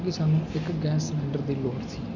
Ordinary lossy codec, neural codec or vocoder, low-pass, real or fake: none; none; 7.2 kHz; real